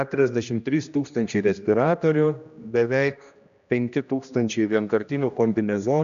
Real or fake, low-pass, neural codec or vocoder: fake; 7.2 kHz; codec, 16 kHz, 1 kbps, X-Codec, HuBERT features, trained on general audio